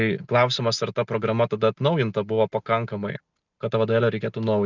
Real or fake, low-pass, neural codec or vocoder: real; 7.2 kHz; none